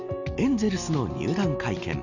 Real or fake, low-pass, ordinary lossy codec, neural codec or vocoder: real; 7.2 kHz; none; none